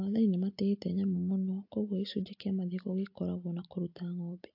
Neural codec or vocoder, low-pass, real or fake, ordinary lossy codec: none; 5.4 kHz; real; AAC, 48 kbps